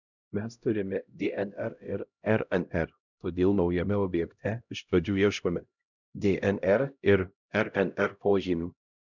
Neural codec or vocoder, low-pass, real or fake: codec, 16 kHz, 0.5 kbps, X-Codec, HuBERT features, trained on LibriSpeech; 7.2 kHz; fake